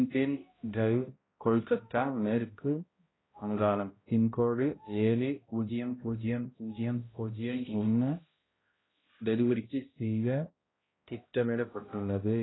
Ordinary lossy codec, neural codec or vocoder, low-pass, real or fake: AAC, 16 kbps; codec, 16 kHz, 0.5 kbps, X-Codec, HuBERT features, trained on balanced general audio; 7.2 kHz; fake